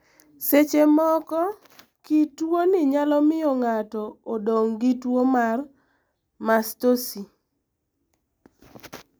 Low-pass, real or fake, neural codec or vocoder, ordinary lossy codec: none; real; none; none